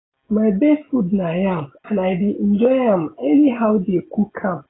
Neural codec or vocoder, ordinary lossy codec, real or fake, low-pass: none; AAC, 16 kbps; real; 7.2 kHz